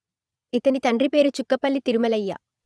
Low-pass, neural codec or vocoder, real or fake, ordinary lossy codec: none; vocoder, 22.05 kHz, 80 mel bands, Vocos; fake; none